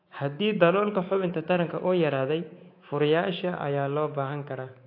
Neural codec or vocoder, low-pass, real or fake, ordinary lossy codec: codec, 24 kHz, 3.1 kbps, DualCodec; 5.4 kHz; fake; AAC, 32 kbps